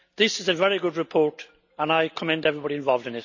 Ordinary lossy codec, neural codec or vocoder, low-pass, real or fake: none; none; 7.2 kHz; real